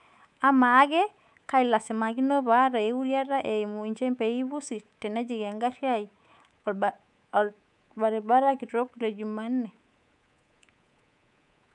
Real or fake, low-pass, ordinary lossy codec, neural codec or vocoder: fake; 10.8 kHz; none; codec, 24 kHz, 3.1 kbps, DualCodec